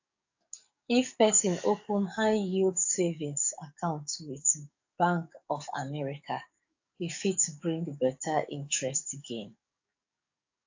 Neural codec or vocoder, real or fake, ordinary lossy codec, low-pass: codec, 44.1 kHz, 7.8 kbps, DAC; fake; AAC, 48 kbps; 7.2 kHz